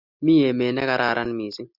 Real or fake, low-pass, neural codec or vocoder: real; 5.4 kHz; none